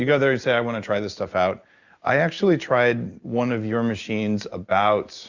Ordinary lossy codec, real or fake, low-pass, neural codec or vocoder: Opus, 64 kbps; real; 7.2 kHz; none